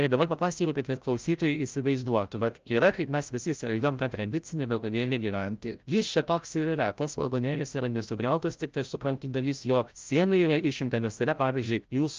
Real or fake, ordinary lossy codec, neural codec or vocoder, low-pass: fake; Opus, 24 kbps; codec, 16 kHz, 0.5 kbps, FreqCodec, larger model; 7.2 kHz